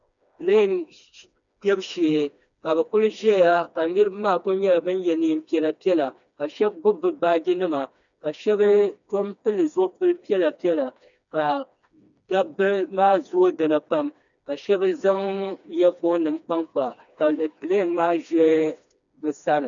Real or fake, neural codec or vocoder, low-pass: fake; codec, 16 kHz, 2 kbps, FreqCodec, smaller model; 7.2 kHz